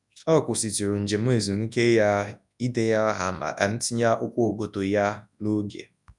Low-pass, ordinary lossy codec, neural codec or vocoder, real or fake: 10.8 kHz; none; codec, 24 kHz, 0.9 kbps, WavTokenizer, large speech release; fake